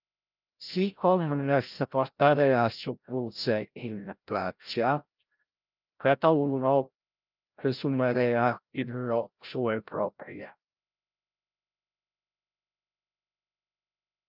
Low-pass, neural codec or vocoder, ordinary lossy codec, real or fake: 5.4 kHz; codec, 16 kHz, 0.5 kbps, FreqCodec, larger model; Opus, 24 kbps; fake